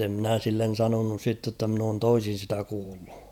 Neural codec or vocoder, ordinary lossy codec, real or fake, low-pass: vocoder, 48 kHz, 128 mel bands, Vocos; none; fake; 19.8 kHz